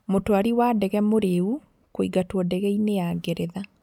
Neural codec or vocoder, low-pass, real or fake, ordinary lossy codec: none; 19.8 kHz; real; none